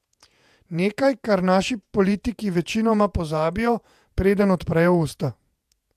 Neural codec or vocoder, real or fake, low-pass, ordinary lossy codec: vocoder, 48 kHz, 128 mel bands, Vocos; fake; 14.4 kHz; AAC, 96 kbps